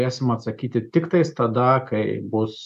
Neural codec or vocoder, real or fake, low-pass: none; real; 14.4 kHz